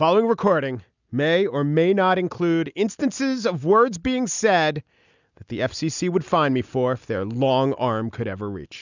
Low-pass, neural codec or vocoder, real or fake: 7.2 kHz; none; real